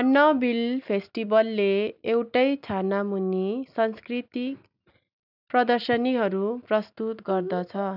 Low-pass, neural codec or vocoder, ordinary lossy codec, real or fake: 5.4 kHz; none; none; real